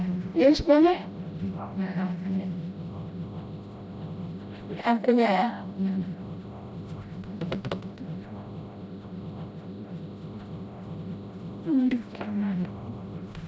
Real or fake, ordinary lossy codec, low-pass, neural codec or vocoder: fake; none; none; codec, 16 kHz, 0.5 kbps, FreqCodec, smaller model